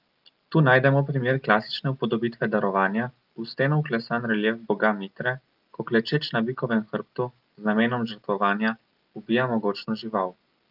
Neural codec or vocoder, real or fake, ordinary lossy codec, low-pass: none; real; Opus, 24 kbps; 5.4 kHz